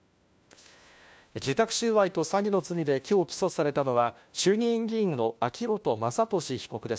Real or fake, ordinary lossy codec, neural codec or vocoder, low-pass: fake; none; codec, 16 kHz, 1 kbps, FunCodec, trained on LibriTTS, 50 frames a second; none